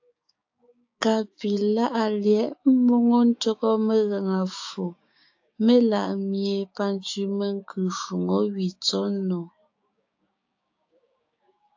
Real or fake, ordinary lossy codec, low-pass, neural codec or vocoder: fake; AAC, 48 kbps; 7.2 kHz; codec, 16 kHz, 6 kbps, DAC